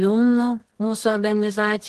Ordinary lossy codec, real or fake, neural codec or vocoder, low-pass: Opus, 16 kbps; fake; codec, 24 kHz, 0.9 kbps, WavTokenizer, medium music audio release; 10.8 kHz